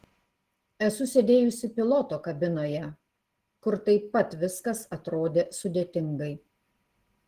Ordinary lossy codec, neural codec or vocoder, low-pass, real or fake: Opus, 16 kbps; none; 14.4 kHz; real